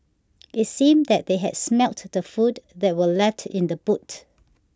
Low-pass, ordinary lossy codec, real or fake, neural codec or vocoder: none; none; real; none